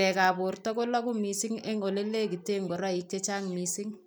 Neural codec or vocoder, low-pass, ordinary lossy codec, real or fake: none; none; none; real